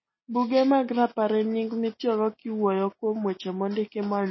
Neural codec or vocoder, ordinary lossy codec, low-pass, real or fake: none; MP3, 24 kbps; 7.2 kHz; real